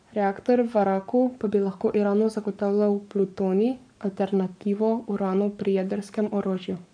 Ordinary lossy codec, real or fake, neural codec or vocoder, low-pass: none; fake; codec, 44.1 kHz, 7.8 kbps, Pupu-Codec; 9.9 kHz